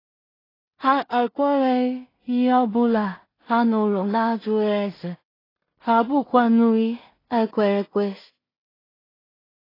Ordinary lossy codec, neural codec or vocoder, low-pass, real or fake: AAC, 24 kbps; codec, 16 kHz in and 24 kHz out, 0.4 kbps, LongCat-Audio-Codec, two codebook decoder; 5.4 kHz; fake